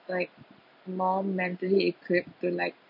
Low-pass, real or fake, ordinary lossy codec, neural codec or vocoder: 5.4 kHz; real; none; none